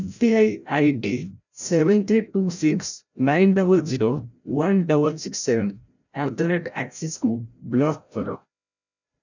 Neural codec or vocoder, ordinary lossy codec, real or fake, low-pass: codec, 16 kHz, 0.5 kbps, FreqCodec, larger model; none; fake; 7.2 kHz